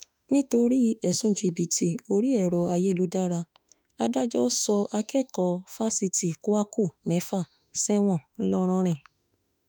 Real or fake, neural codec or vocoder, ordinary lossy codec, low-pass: fake; autoencoder, 48 kHz, 32 numbers a frame, DAC-VAE, trained on Japanese speech; none; none